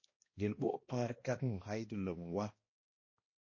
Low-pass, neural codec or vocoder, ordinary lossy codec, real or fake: 7.2 kHz; codec, 16 kHz, 1 kbps, X-Codec, HuBERT features, trained on balanced general audio; MP3, 32 kbps; fake